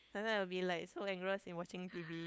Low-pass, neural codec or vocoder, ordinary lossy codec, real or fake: none; codec, 16 kHz, 8 kbps, FunCodec, trained on LibriTTS, 25 frames a second; none; fake